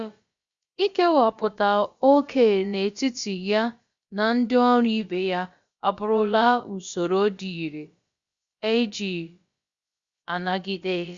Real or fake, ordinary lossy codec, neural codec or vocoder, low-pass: fake; Opus, 64 kbps; codec, 16 kHz, about 1 kbps, DyCAST, with the encoder's durations; 7.2 kHz